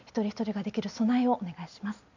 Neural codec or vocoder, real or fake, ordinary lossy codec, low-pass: none; real; none; 7.2 kHz